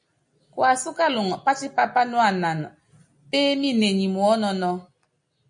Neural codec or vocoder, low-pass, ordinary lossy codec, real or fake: none; 9.9 kHz; MP3, 48 kbps; real